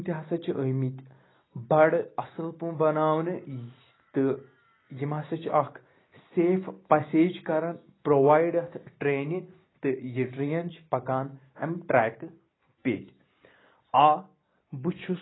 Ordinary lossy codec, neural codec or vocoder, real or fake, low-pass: AAC, 16 kbps; none; real; 7.2 kHz